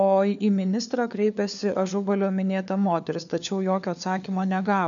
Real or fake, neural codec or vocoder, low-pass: fake; codec, 16 kHz, 4 kbps, FunCodec, trained on LibriTTS, 50 frames a second; 7.2 kHz